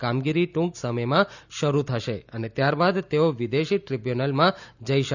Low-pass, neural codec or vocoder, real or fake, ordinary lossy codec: 7.2 kHz; none; real; none